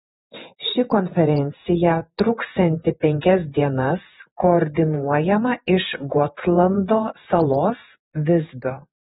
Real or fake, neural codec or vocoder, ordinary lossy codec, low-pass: fake; vocoder, 48 kHz, 128 mel bands, Vocos; AAC, 16 kbps; 19.8 kHz